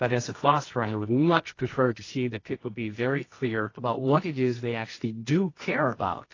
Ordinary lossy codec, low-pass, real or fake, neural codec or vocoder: AAC, 32 kbps; 7.2 kHz; fake; codec, 24 kHz, 0.9 kbps, WavTokenizer, medium music audio release